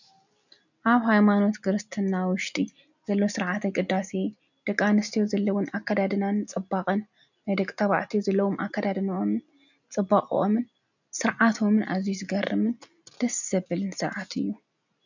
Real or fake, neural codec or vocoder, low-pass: real; none; 7.2 kHz